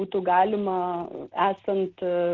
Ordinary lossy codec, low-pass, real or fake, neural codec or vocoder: Opus, 16 kbps; 7.2 kHz; real; none